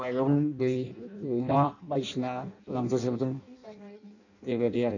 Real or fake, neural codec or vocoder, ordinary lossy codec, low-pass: fake; codec, 16 kHz in and 24 kHz out, 0.6 kbps, FireRedTTS-2 codec; none; 7.2 kHz